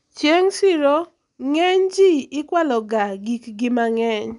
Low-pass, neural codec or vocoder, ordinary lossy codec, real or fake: 10.8 kHz; none; none; real